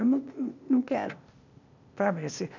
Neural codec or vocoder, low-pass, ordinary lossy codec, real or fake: codec, 16 kHz, 0.8 kbps, ZipCodec; 7.2 kHz; none; fake